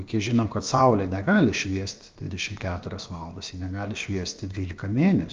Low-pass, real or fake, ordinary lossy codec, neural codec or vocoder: 7.2 kHz; fake; Opus, 24 kbps; codec, 16 kHz, about 1 kbps, DyCAST, with the encoder's durations